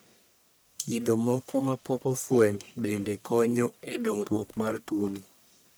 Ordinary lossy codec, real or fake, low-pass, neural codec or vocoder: none; fake; none; codec, 44.1 kHz, 1.7 kbps, Pupu-Codec